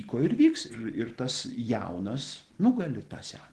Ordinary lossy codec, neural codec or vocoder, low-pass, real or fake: Opus, 16 kbps; none; 10.8 kHz; real